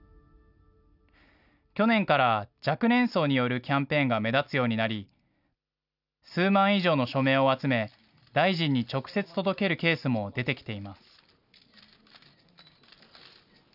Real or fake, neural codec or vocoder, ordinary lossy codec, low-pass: real; none; none; 5.4 kHz